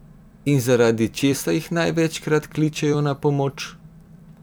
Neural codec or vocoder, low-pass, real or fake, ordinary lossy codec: vocoder, 44.1 kHz, 128 mel bands every 256 samples, BigVGAN v2; none; fake; none